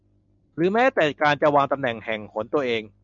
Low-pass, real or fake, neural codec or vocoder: 7.2 kHz; real; none